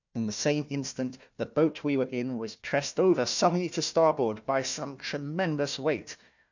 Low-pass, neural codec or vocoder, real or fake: 7.2 kHz; codec, 16 kHz, 1 kbps, FunCodec, trained on Chinese and English, 50 frames a second; fake